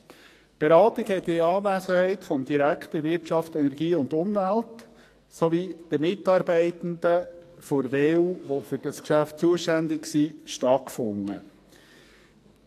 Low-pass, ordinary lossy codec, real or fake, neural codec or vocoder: 14.4 kHz; AAC, 64 kbps; fake; codec, 44.1 kHz, 2.6 kbps, SNAC